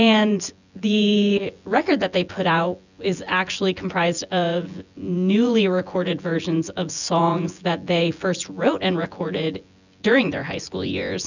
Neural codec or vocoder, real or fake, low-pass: vocoder, 24 kHz, 100 mel bands, Vocos; fake; 7.2 kHz